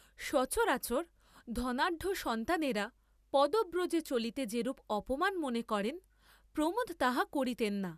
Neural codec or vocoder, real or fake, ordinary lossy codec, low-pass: none; real; none; 14.4 kHz